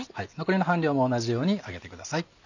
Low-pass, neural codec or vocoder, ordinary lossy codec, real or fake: 7.2 kHz; none; none; real